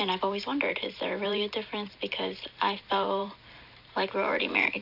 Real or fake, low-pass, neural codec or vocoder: fake; 5.4 kHz; vocoder, 44.1 kHz, 128 mel bands every 512 samples, BigVGAN v2